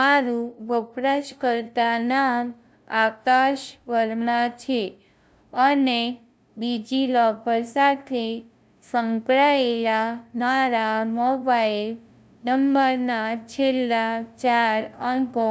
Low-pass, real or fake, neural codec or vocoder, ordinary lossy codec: none; fake; codec, 16 kHz, 0.5 kbps, FunCodec, trained on LibriTTS, 25 frames a second; none